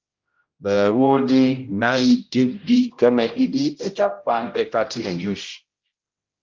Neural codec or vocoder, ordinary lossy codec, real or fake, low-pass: codec, 16 kHz, 0.5 kbps, X-Codec, HuBERT features, trained on general audio; Opus, 32 kbps; fake; 7.2 kHz